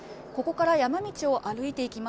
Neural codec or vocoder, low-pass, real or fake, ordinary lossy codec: none; none; real; none